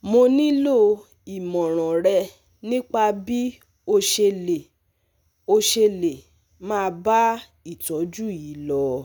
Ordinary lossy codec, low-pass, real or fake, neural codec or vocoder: none; none; real; none